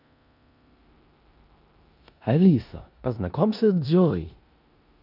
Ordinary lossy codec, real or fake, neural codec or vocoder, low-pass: none; fake; codec, 16 kHz in and 24 kHz out, 0.9 kbps, LongCat-Audio-Codec, four codebook decoder; 5.4 kHz